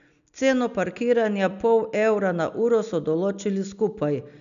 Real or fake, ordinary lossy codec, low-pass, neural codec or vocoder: real; none; 7.2 kHz; none